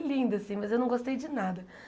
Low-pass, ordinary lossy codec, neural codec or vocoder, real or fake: none; none; none; real